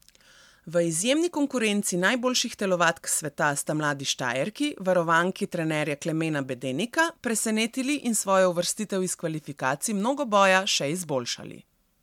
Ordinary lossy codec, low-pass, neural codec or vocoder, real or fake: MP3, 96 kbps; 19.8 kHz; none; real